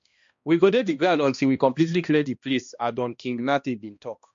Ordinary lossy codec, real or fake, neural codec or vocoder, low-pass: MP3, 48 kbps; fake; codec, 16 kHz, 1 kbps, X-Codec, HuBERT features, trained on balanced general audio; 7.2 kHz